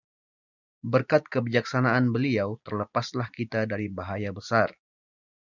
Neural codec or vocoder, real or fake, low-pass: none; real; 7.2 kHz